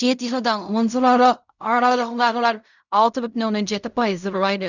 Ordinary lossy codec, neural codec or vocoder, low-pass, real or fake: none; codec, 16 kHz in and 24 kHz out, 0.4 kbps, LongCat-Audio-Codec, fine tuned four codebook decoder; 7.2 kHz; fake